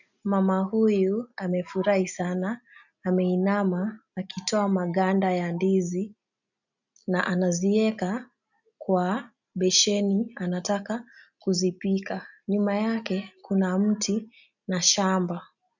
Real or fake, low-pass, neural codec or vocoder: real; 7.2 kHz; none